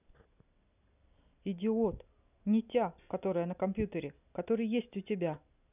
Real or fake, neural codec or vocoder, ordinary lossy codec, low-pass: real; none; none; 3.6 kHz